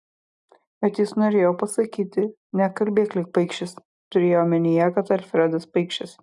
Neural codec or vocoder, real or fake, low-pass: none; real; 10.8 kHz